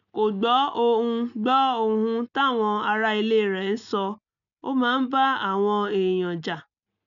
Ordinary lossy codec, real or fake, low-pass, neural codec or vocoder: none; real; 7.2 kHz; none